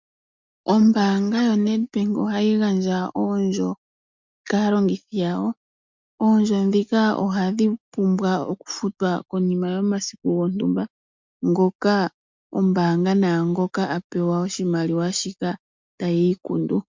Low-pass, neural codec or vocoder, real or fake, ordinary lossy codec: 7.2 kHz; none; real; MP3, 64 kbps